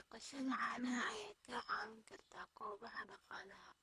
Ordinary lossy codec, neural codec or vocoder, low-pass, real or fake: none; codec, 24 kHz, 3 kbps, HILCodec; none; fake